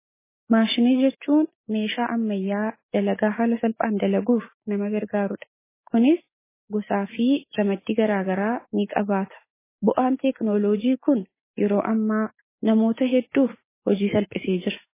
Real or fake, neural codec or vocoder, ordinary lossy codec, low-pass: real; none; MP3, 16 kbps; 3.6 kHz